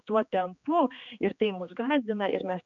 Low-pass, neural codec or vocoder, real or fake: 7.2 kHz; codec, 16 kHz, 2 kbps, X-Codec, HuBERT features, trained on general audio; fake